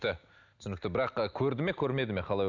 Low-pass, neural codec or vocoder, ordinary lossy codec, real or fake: 7.2 kHz; none; none; real